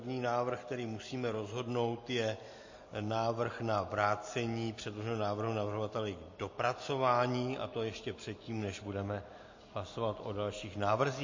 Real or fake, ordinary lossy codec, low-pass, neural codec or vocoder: real; MP3, 32 kbps; 7.2 kHz; none